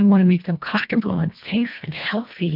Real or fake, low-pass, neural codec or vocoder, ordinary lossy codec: fake; 5.4 kHz; codec, 24 kHz, 1.5 kbps, HILCodec; MP3, 48 kbps